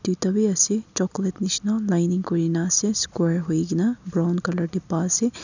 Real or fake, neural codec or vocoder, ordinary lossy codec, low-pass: real; none; none; 7.2 kHz